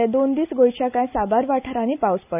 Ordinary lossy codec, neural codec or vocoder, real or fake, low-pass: none; none; real; 3.6 kHz